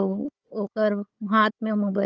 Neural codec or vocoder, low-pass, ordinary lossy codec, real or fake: codec, 16 kHz, 4 kbps, FunCodec, trained on Chinese and English, 50 frames a second; 7.2 kHz; Opus, 24 kbps; fake